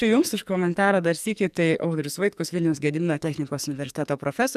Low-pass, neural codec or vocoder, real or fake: 14.4 kHz; codec, 44.1 kHz, 2.6 kbps, SNAC; fake